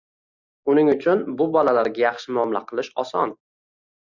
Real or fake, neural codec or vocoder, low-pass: real; none; 7.2 kHz